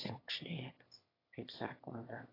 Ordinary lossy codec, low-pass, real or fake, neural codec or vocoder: AAC, 24 kbps; 5.4 kHz; fake; autoencoder, 22.05 kHz, a latent of 192 numbers a frame, VITS, trained on one speaker